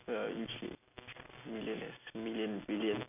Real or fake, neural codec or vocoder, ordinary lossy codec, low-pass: real; none; none; 3.6 kHz